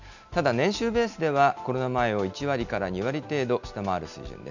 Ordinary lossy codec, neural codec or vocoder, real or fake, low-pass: none; none; real; 7.2 kHz